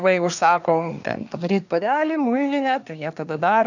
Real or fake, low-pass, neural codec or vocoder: fake; 7.2 kHz; codec, 24 kHz, 1 kbps, SNAC